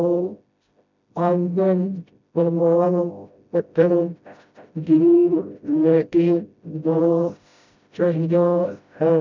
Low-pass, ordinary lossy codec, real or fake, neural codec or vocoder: 7.2 kHz; MP3, 64 kbps; fake; codec, 16 kHz, 0.5 kbps, FreqCodec, smaller model